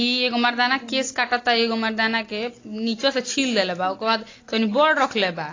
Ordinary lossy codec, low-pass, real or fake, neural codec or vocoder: AAC, 32 kbps; 7.2 kHz; real; none